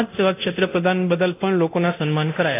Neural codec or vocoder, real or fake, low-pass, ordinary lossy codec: codec, 24 kHz, 0.9 kbps, DualCodec; fake; 3.6 kHz; AAC, 24 kbps